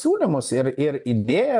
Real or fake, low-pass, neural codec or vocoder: fake; 10.8 kHz; vocoder, 24 kHz, 100 mel bands, Vocos